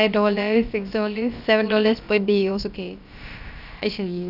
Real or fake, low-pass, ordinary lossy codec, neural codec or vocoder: fake; 5.4 kHz; none; codec, 16 kHz, about 1 kbps, DyCAST, with the encoder's durations